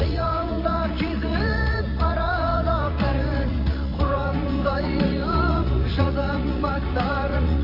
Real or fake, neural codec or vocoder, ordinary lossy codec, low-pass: fake; vocoder, 44.1 kHz, 80 mel bands, Vocos; AAC, 32 kbps; 5.4 kHz